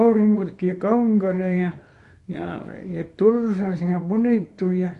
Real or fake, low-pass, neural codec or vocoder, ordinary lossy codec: fake; 10.8 kHz; codec, 24 kHz, 0.9 kbps, WavTokenizer, small release; MP3, 48 kbps